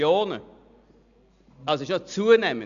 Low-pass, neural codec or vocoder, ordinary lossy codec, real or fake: 7.2 kHz; none; none; real